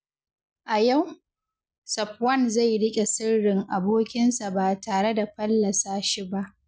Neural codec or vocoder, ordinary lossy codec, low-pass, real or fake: none; none; none; real